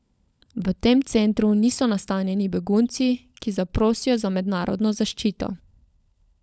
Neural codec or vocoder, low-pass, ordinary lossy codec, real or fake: codec, 16 kHz, 16 kbps, FunCodec, trained on LibriTTS, 50 frames a second; none; none; fake